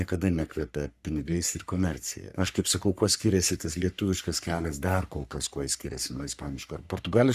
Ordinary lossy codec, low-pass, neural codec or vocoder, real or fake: Opus, 64 kbps; 14.4 kHz; codec, 44.1 kHz, 3.4 kbps, Pupu-Codec; fake